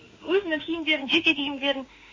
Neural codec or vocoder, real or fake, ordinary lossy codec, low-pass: autoencoder, 48 kHz, 32 numbers a frame, DAC-VAE, trained on Japanese speech; fake; MP3, 32 kbps; 7.2 kHz